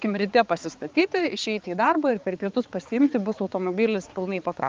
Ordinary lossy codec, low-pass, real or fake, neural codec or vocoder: Opus, 24 kbps; 7.2 kHz; fake; codec, 16 kHz, 4 kbps, X-Codec, HuBERT features, trained on balanced general audio